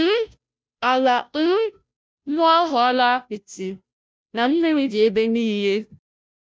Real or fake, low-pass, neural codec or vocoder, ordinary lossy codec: fake; none; codec, 16 kHz, 0.5 kbps, FunCodec, trained on Chinese and English, 25 frames a second; none